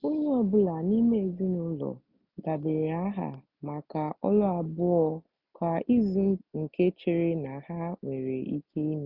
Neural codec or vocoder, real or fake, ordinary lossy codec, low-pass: none; real; none; 5.4 kHz